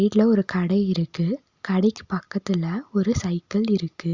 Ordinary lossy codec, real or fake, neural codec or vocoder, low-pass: Opus, 64 kbps; real; none; 7.2 kHz